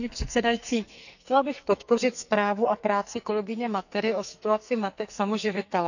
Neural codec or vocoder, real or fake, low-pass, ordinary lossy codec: codec, 44.1 kHz, 2.6 kbps, SNAC; fake; 7.2 kHz; none